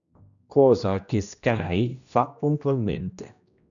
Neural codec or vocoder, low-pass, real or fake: codec, 16 kHz, 1 kbps, X-Codec, HuBERT features, trained on general audio; 7.2 kHz; fake